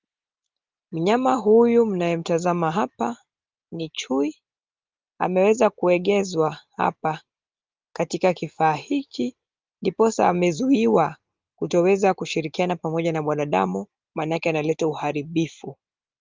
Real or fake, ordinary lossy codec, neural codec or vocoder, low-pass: real; Opus, 32 kbps; none; 7.2 kHz